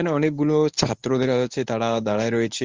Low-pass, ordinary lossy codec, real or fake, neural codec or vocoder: 7.2 kHz; Opus, 32 kbps; fake; codec, 24 kHz, 0.9 kbps, WavTokenizer, medium speech release version 1